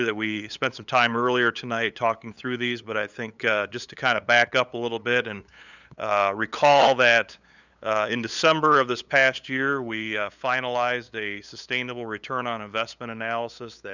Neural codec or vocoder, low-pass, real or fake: codec, 16 kHz, 8 kbps, FunCodec, trained on LibriTTS, 25 frames a second; 7.2 kHz; fake